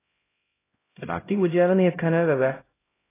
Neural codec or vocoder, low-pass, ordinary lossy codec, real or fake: codec, 16 kHz, 0.5 kbps, X-Codec, HuBERT features, trained on LibriSpeech; 3.6 kHz; AAC, 16 kbps; fake